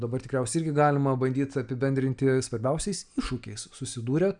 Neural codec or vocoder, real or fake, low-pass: none; real; 9.9 kHz